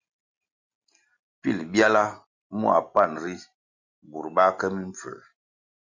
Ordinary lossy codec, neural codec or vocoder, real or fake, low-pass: Opus, 64 kbps; none; real; 7.2 kHz